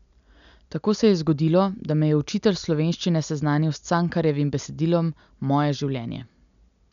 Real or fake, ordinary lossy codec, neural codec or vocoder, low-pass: real; none; none; 7.2 kHz